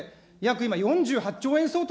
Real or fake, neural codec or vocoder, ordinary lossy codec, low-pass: real; none; none; none